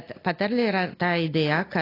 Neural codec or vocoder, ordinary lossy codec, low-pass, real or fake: none; AAC, 24 kbps; 5.4 kHz; real